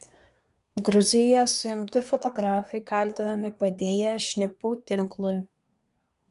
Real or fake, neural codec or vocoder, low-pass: fake; codec, 24 kHz, 1 kbps, SNAC; 10.8 kHz